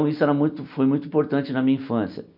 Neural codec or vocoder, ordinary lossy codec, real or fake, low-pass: none; none; real; 5.4 kHz